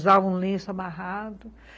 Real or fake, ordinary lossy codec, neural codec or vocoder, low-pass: real; none; none; none